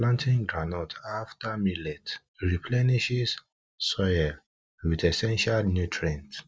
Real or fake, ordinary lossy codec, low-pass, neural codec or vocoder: real; none; none; none